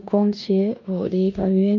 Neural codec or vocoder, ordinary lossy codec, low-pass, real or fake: codec, 16 kHz in and 24 kHz out, 0.9 kbps, LongCat-Audio-Codec, four codebook decoder; none; 7.2 kHz; fake